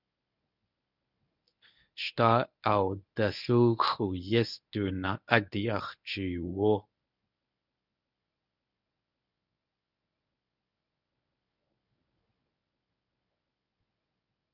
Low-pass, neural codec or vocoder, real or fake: 5.4 kHz; codec, 24 kHz, 0.9 kbps, WavTokenizer, medium speech release version 1; fake